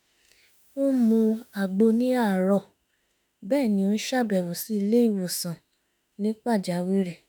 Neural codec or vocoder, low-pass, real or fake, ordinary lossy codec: autoencoder, 48 kHz, 32 numbers a frame, DAC-VAE, trained on Japanese speech; none; fake; none